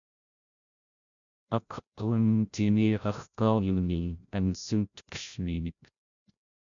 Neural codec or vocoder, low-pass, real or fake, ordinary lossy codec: codec, 16 kHz, 0.5 kbps, FreqCodec, larger model; 7.2 kHz; fake; MP3, 96 kbps